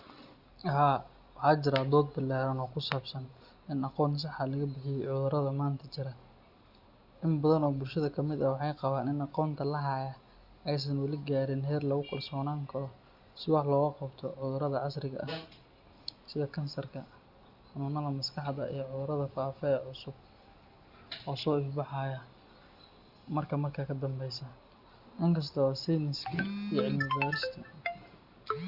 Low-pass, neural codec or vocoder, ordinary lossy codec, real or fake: 5.4 kHz; none; Opus, 64 kbps; real